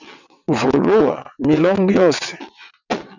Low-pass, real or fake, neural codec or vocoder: 7.2 kHz; fake; vocoder, 22.05 kHz, 80 mel bands, WaveNeXt